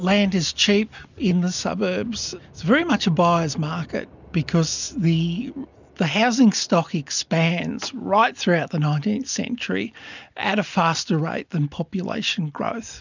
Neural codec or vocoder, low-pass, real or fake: none; 7.2 kHz; real